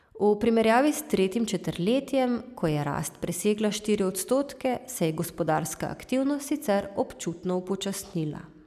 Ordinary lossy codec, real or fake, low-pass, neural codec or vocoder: none; real; 14.4 kHz; none